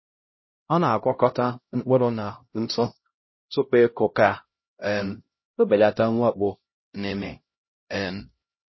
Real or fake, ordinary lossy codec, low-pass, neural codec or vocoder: fake; MP3, 24 kbps; 7.2 kHz; codec, 16 kHz, 0.5 kbps, X-Codec, HuBERT features, trained on LibriSpeech